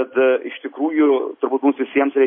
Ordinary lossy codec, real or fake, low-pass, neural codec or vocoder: MP3, 24 kbps; real; 5.4 kHz; none